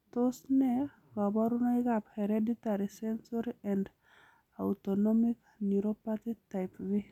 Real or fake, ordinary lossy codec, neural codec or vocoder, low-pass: real; none; none; 19.8 kHz